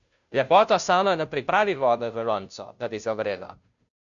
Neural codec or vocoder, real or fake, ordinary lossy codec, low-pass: codec, 16 kHz, 0.5 kbps, FunCodec, trained on Chinese and English, 25 frames a second; fake; MP3, 64 kbps; 7.2 kHz